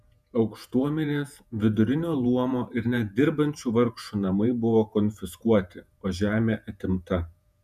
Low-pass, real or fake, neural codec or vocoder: 14.4 kHz; fake; vocoder, 48 kHz, 128 mel bands, Vocos